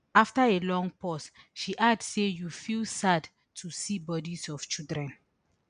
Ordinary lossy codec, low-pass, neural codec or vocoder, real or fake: none; 9.9 kHz; none; real